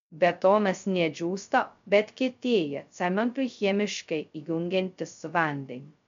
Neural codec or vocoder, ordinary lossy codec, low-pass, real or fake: codec, 16 kHz, 0.2 kbps, FocalCodec; MP3, 64 kbps; 7.2 kHz; fake